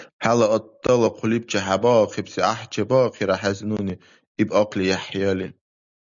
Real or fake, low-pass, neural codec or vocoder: real; 7.2 kHz; none